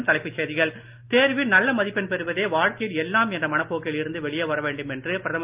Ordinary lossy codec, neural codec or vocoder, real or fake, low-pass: Opus, 24 kbps; none; real; 3.6 kHz